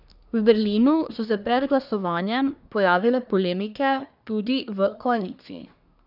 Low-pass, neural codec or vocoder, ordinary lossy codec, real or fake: 5.4 kHz; codec, 24 kHz, 1 kbps, SNAC; none; fake